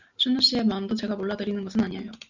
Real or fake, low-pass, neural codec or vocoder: real; 7.2 kHz; none